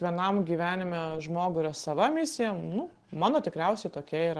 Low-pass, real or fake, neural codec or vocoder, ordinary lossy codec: 10.8 kHz; real; none; Opus, 16 kbps